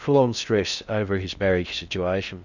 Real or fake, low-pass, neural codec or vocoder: fake; 7.2 kHz; codec, 16 kHz in and 24 kHz out, 0.6 kbps, FocalCodec, streaming, 2048 codes